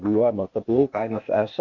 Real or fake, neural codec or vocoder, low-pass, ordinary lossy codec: fake; codec, 16 kHz, 0.8 kbps, ZipCodec; 7.2 kHz; MP3, 48 kbps